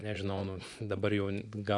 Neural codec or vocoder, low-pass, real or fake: vocoder, 24 kHz, 100 mel bands, Vocos; 10.8 kHz; fake